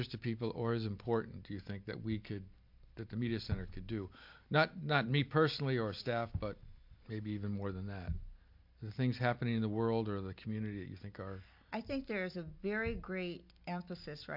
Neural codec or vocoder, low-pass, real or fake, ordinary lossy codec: none; 5.4 kHz; real; MP3, 48 kbps